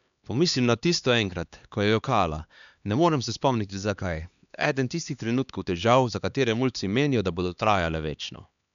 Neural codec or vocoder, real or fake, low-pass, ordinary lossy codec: codec, 16 kHz, 2 kbps, X-Codec, HuBERT features, trained on LibriSpeech; fake; 7.2 kHz; MP3, 96 kbps